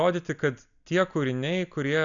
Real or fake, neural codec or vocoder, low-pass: real; none; 7.2 kHz